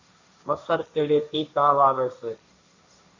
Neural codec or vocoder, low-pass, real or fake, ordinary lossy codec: codec, 16 kHz, 1.1 kbps, Voila-Tokenizer; 7.2 kHz; fake; AAC, 48 kbps